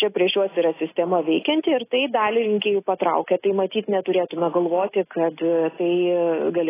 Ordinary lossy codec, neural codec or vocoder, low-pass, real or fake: AAC, 16 kbps; none; 3.6 kHz; real